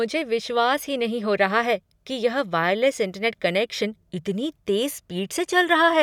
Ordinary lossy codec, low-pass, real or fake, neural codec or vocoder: none; 19.8 kHz; real; none